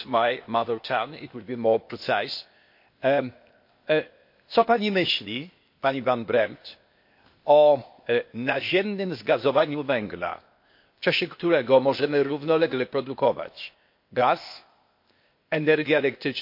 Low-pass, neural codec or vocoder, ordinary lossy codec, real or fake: 5.4 kHz; codec, 16 kHz, 0.8 kbps, ZipCodec; MP3, 32 kbps; fake